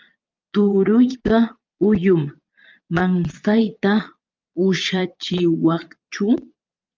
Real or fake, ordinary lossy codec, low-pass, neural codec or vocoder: fake; Opus, 24 kbps; 7.2 kHz; vocoder, 22.05 kHz, 80 mel bands, Vocos